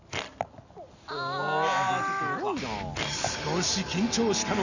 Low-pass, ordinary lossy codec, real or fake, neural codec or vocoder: 7.2 kHz; none; real; none